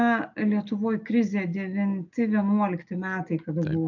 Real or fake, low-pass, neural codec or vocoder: real; 7.2 kHz; none